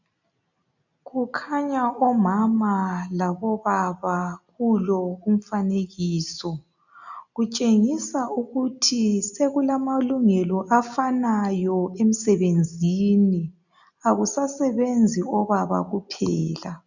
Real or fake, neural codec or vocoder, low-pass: real; none; 7.2 kHz